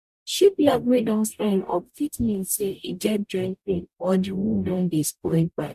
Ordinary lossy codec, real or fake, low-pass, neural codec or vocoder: none; fake; 14.4 kHz; codec, 44.1 kHz, 0.9 kbps, DAC